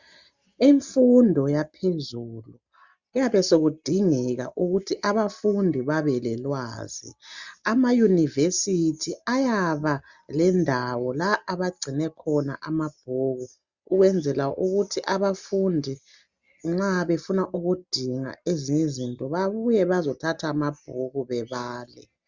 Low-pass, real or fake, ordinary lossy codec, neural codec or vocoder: 7.2 kHz; real; Opus, 64 kbps; none